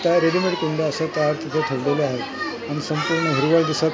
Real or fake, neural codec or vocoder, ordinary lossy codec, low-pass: real; none; none; none